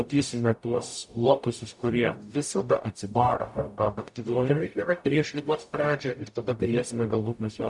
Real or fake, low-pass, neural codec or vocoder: fake; 10.8 kHz; codec, 44.1 kHz, 0.9 kbps, DAC